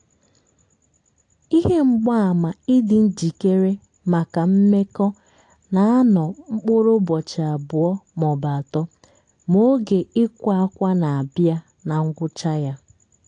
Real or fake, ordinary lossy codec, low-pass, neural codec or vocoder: real; AAC, 48 kbps; 9.9 kHz; none